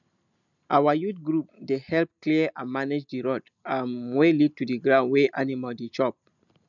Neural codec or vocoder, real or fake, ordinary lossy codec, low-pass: none; real; none; 7.2 kHz